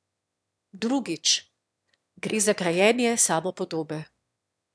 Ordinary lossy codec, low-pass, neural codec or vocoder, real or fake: none; none; autoencoder, 22.05 kHz, a latent of 192 numbers a frame, VITS, trained on one speaker; fake